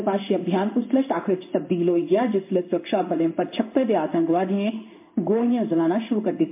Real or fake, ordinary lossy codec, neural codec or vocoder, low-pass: fake; MP3, 32 kbps; codec, 16 kHz in and 24 kHz out, 1 kbps, XY-Tokenizer; 3.6 kHz